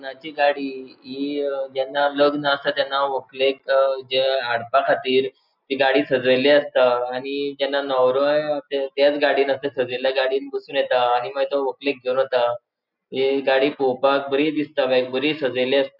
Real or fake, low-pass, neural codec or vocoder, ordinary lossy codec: real; 5.4 kHz; none; none